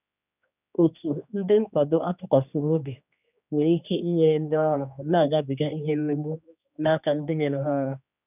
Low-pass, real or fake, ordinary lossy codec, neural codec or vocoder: 3.6 kHz; fake; none; codec, 16 kHz, 2 kbps, X-Codec, HuBERT features, trained on general audio